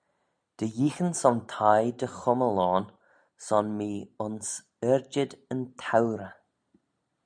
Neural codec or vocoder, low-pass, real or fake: none; 9.9 kHz; real